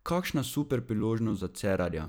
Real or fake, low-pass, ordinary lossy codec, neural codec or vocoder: fake; none; none; vocoder, 44.1 kHz, 128 mel bands every 256 samples, BigVGAN v2